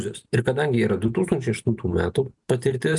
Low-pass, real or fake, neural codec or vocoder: 10.8 kHz; real; none